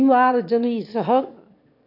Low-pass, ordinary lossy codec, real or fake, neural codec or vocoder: 5.4 kHz; none; fake; autoencoder, 22.05 kHz, a latent of 192 numbers a frame, VITS, trained on one speaker